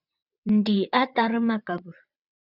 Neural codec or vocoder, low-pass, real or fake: vocoder, 22.05 kHz, 80 mel bands, WaveNeXt; 5.4 kHz; fake